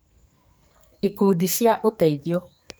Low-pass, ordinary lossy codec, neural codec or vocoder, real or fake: none; none; codec, 44.1 kHz, 2.6 kbps, SNAC; fake